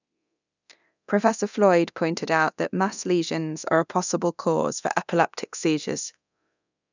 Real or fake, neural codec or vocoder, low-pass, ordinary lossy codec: fake; codec, 24 kHz, 0.9 kbps, DualCodec; 7.2 kHz; none